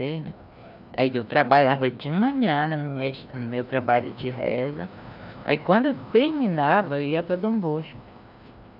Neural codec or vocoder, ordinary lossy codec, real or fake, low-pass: codec, 16 kHz, 1 kbps, FreqCodec, larger model; none; fake; 5.4 kHz